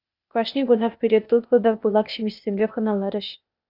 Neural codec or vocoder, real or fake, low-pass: codec, 16 kHz, 0.8 kbps, ZipCodec; fake; 5.4 kHz